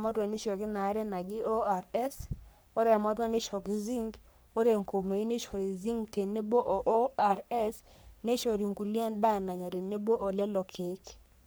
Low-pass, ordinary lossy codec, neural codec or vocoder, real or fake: none; none; codec, 44.1 kHz, 3.4 kbps, Pupu-Codec; fake